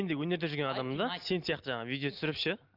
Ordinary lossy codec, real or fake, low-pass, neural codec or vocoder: Opus, 24 kbps; real; 5.4 kHz; none